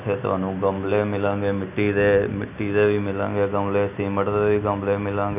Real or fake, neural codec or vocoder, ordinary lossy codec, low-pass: real; none; none; 3.6 kHz